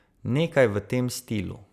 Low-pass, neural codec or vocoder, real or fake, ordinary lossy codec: 14.4 kHz; none; real; none